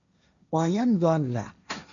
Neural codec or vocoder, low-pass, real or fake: codec, 16 kHz, 1.1 kbps, Voila-Tokenizer; 7.2 kHz; fake